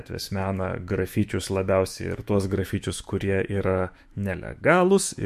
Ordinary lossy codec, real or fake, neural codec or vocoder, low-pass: MP3, 64 kbps; fake; autoencoder, 48 kHz, 128 numbers a frame, DAC-VAE, trained on Japanese speech; 14.4 kHz